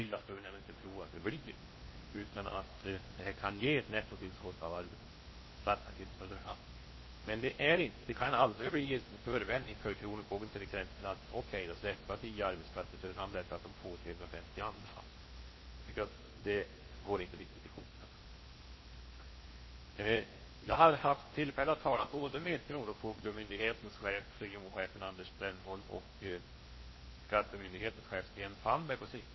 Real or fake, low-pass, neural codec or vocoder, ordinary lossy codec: fake; 7.2 kHz; codec, 16 kHz in and 24 kHz out, 0.8 kbps, FocalCodec, streaming, 65536 codes; MP3, 24 kbps